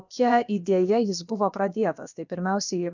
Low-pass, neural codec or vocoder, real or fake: 7.2 kHz; codec, 16 kHz, about 1 kbps, DyCAST, with the encoder's durations; fake